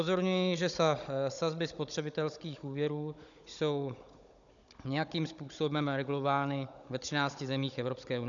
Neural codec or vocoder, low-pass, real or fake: codec, 16 kHz, 16 kbps, FunCodec, trained on Chinese and English, 50 frames a second; 7.2 kHz; fake